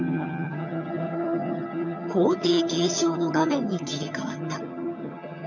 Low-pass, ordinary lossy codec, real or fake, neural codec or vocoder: 7.2 kHz; none; fake; vocoder, 22.05 kHz, 80 mel bands, HiFi-GAN